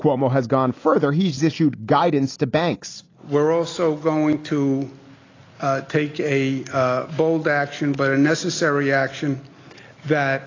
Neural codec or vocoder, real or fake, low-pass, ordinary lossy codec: none; real; 7.2 kHz; AAC, 32 kbps